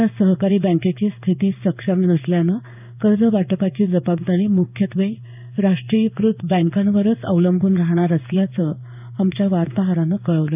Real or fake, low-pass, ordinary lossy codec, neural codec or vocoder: fake; 3.6 kHz; none; codec, 16 kHz in and 24 kHz out, 1 kbps, XY-Tokenizer